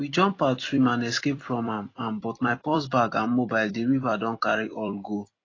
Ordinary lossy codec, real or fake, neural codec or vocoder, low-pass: AAC, 32 kbps; fake; vocoder, 44.1 kHz, 128 mel bands every 256 samples, BigVGAN v2; 7.2 kHz